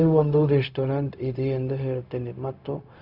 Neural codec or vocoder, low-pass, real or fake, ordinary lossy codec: codec, 16 kHz, 0.4 kbps, LongCat-Audio-Codec; 5.4 kHz; fake; none